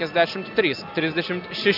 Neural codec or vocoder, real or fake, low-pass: none; real; 5.4 kHz